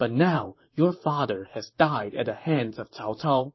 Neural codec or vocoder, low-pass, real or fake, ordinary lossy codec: vocoder, 44.1 kHz, 128 mel bands, Pupu-Vocoder; 7.2 kHz; fake; MP3, 24 kbps